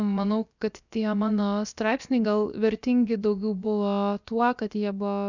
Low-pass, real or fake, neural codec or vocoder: 7.2 kHz; fake; codec, 16 kHz, about 1 kbps, DyCAST, with the encoder's durations